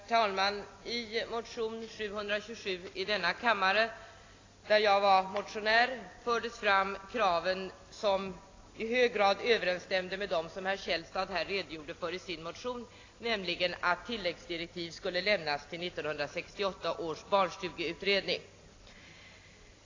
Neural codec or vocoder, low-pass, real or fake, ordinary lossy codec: none; 7.2 kHz; real; AAC, 32 kbps